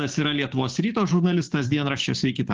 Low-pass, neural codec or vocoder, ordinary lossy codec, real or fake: 7.2 kHz; codec, 16 kHz, 6 kbps, DAC; Opus, 16 kbps; fake